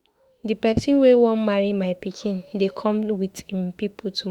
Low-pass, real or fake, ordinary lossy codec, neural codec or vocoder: 19.8 kHz; fake; Opus, 64 kbps; autoencoder, 48 kHz, 32 numbers a frame, DAC-VAE, trained on Japanese speech